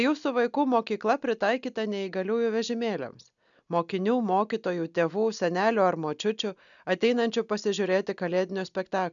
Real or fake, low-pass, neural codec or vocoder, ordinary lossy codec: real; 7.2 kHz; none; MP3, 96 kbps